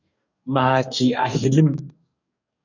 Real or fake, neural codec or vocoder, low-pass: fake; codec, 44.1 kHz, 2.6 kbps, DAC; 7.2 kHz